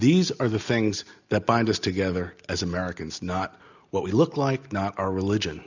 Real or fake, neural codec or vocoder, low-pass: real; none; 7.2 kHz